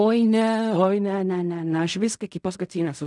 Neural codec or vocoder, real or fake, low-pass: codec, 16 kHz in and 24 kHz out, 0.4 kbps, LongCat-Audio-Codec, fine tuned four codebook decoder; fake; 10.8 kHz